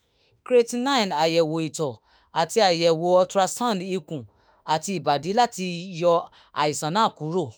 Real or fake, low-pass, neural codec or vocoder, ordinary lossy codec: fake; none; autoencoder, 48 kHz, 32 numbers a frame, DAC-VAE, trained on Japanese speech; none